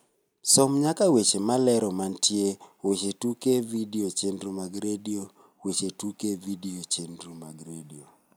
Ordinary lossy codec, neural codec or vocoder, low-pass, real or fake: none; none; none; real